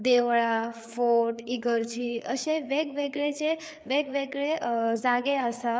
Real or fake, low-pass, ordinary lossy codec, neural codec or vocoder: fake; none; none; codec, 16 kHz, 4 kbps, FreqCodec, larger model